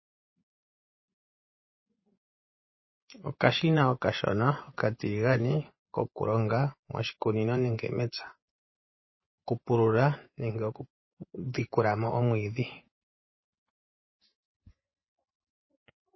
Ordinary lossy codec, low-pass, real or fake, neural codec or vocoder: MP3, 24 kbps; 7.2 kHz; fake; vocoder, 24 kHz, 100 mel bands, Vocos